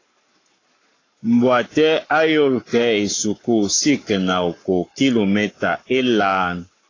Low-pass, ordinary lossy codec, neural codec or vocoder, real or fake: 7.2 kHz; AAC, 32 kbps; codec, 44.1 kHz, 7.8 kbps, Pupu-Codec; fake